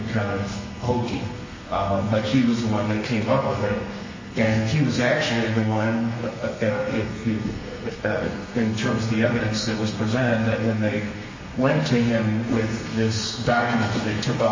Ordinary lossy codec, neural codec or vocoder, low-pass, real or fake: MP3, 32 kbps; codec, 32 kHz, 1.9 kbps, SNAC; 7.2 kHz; fake